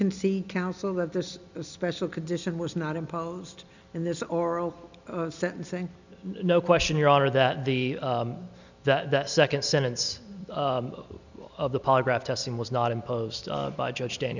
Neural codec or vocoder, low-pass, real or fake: none; 7.2 kHz; real